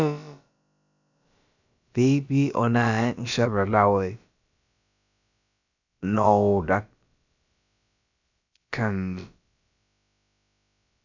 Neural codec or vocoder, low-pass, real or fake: codec, 16 kHz, about 1 kbps, DyCAST, with the encoder's durations; 7.2 kHz; fake